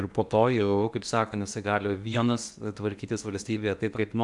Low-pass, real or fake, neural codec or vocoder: 10.8 kHz; fake; codec, 16 kHz in and 24 kHz out, 0.8 kbps, FocalCodec, streaming, 65536 codes